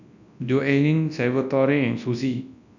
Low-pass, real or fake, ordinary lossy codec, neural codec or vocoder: 7.2 kHz; fake; none; codec, 24 kHz, 0.9 kbps, WavTokenizer, large speech release